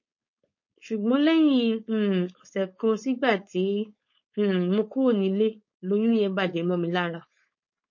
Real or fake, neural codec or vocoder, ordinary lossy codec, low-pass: fake; codec, 16 kHz, 4.8 kbps, FACodec; MP3, 32 kbps; 7.2 kHz